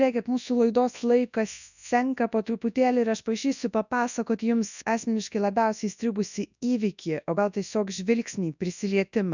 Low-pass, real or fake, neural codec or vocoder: 7.2 kHz; fake; codec, 24 kHz, 0.9 kbps, WavTokenizer, large speech release